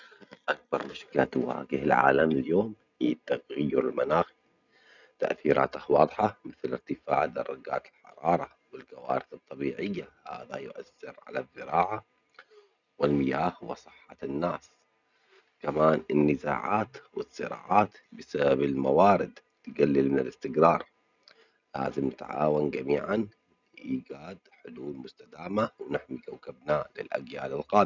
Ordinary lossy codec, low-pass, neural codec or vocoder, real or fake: none; 7.2 kHz; none; real